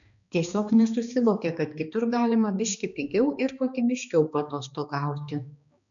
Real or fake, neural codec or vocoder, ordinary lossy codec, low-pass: fake; codec, 16 kHz, 2 kbps, X-Codec, HuBERT features, trained on balanced general audio; MP3, 96 kbps; 7.2 kHz